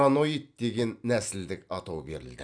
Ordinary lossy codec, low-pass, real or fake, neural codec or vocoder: AAC, 64 kbps; 9.9 kHz; real; none